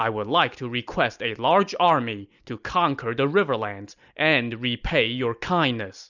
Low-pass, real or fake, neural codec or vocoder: 7.2 kHz; real; none